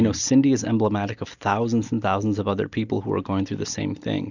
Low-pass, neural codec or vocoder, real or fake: 7.2 kHz; none; real